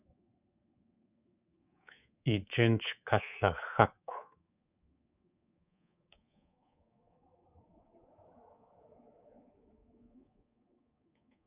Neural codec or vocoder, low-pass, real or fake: codec, 24 kHz, 3.1 kbps, DualCodec; 3.6 kHz; fake